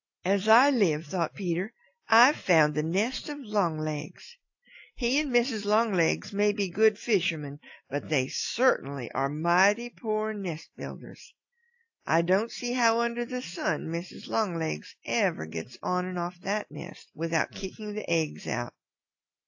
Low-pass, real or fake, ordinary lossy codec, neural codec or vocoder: 7.2 kHz; real; AAC, 48 kbps; none